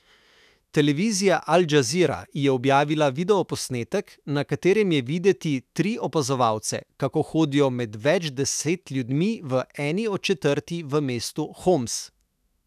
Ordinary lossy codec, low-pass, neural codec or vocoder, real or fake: none; 14.4 kHz; autoencoder, 48 kHz, 128 numbers a frame, DAC-VAE, trained on Japanese speech; fake